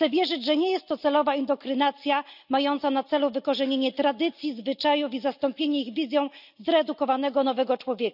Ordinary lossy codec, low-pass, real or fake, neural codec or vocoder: none; 5.4 kHz; real; none